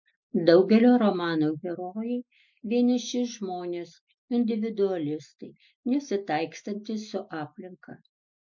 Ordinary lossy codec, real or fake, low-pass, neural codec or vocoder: MP3, 64 kbps; real; 7.2 kHz; none